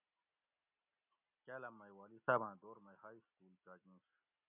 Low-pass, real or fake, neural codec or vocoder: 3.6 kHz; real; none